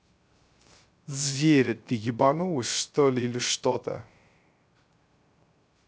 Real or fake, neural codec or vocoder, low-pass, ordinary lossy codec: fake; codec, 16 kHz, 0.3 kbps, FocalCodec; none; none